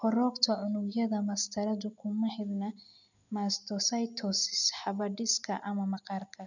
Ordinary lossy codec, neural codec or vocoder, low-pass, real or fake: none; none; 7.2 kHz; real